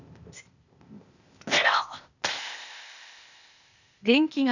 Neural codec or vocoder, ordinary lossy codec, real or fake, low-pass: codec, 16 kHz, 0.8 kbps, ZipCodec; none; fake; 7.2 kHz